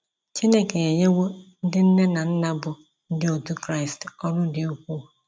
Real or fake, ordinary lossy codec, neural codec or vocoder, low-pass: real; none; none; none